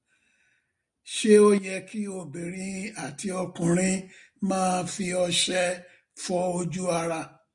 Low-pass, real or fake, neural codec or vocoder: 10.8 kHz; real; none